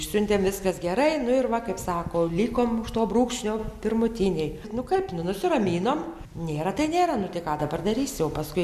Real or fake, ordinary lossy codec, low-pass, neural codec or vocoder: real; MP3, 96 kbps; 14.4 kHz; none